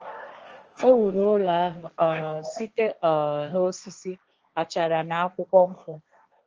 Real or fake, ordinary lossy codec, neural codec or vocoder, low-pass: fake; Opus, 24 kbps; codec, 16 kHz, 1.1 kbps, Voila-Tokenizer; 7.2 kHz